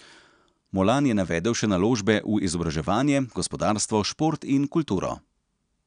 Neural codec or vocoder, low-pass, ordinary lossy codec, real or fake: none; 9.9 kHz; none; real